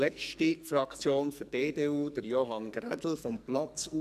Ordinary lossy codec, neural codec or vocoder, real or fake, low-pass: none; codec, 44.1 kHz, 2.6 kbps, SNAC; fake; 14.4 kHz